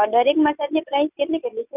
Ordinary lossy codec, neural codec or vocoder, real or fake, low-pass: none; none; real; 3.6 kHz